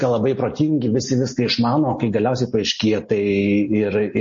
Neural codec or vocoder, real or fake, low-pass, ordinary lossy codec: none; real; 7.2 kHz; MP3, 32 kbps